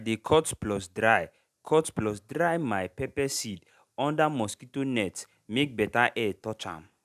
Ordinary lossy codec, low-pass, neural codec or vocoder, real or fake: none; 14.4 kHz; none; real